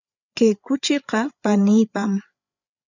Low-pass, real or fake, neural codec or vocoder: 7.2 kHz; fake; codec, 16 kHz, 8 kbps, FreqCodec, larger model